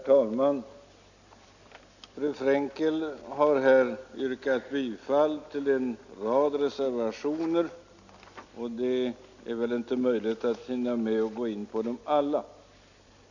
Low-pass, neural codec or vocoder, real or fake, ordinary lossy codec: 7.2 kHz; none; real; none